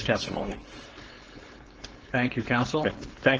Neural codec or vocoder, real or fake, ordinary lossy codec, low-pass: codec, 16 kHz, 4.8 kbps, FACodec; fake; Opus, 16 kbps; 7.2 kHz